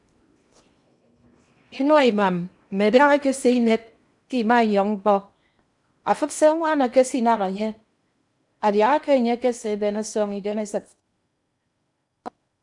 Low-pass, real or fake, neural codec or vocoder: 10.8 kHz; fake; codec, 16 kHz in and 24 kHz out, 0.8 kbps, FocalCodec, streaming, 65536 codes